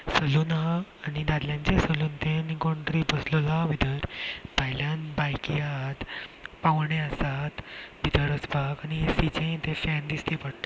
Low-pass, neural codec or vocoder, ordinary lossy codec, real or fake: none; none; none; real